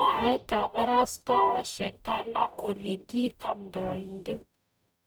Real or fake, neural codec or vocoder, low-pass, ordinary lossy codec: fake; codec, 44.1 kHz, 0.9 kbps, DAC; none; none